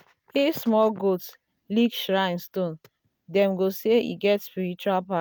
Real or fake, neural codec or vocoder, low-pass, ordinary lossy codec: real; none; none; none